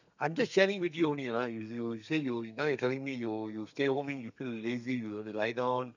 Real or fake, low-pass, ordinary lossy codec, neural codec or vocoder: fake; 7.2 kHz; none; codec, 32 kHz, 1.9 kbps, SNAC